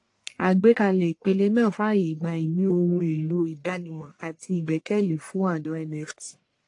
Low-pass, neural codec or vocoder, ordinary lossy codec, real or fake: 10.8 kHz; codec, 44.1 kHz, 1.7 kbps, Pupu-Codec; AAC, 48 kbps; fake